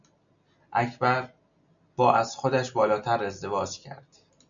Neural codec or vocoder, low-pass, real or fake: none; 7.2 kHz; real